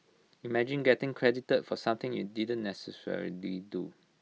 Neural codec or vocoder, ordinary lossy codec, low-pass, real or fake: none; none; none; real